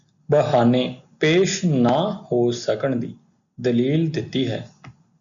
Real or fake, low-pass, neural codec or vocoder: real; 7.2 kHz; none